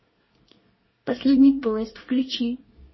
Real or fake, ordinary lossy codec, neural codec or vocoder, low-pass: fake; MP3, 24 kbps; codec, 24 kHz, 1 kbps, SNAC; 7.2 kHz